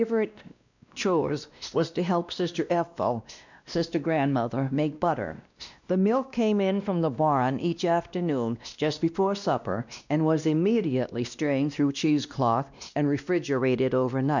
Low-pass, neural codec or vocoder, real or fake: 7.2 kHz; codec, 16 kHz, 1 kbps, X-Codec, WavLM features, trained on Multilingual LibriSpeech; fake